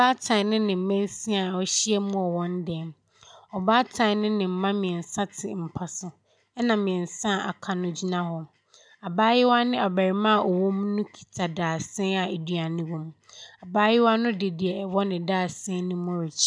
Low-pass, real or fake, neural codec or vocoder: 9.9 kHz; real; none